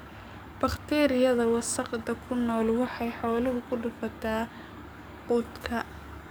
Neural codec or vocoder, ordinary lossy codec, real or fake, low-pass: codec, 44.1 kHz, 7.8 kbps, DAC; none; fake; none